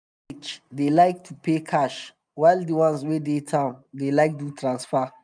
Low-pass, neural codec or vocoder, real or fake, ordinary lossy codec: 9.9 kHz; none; real; none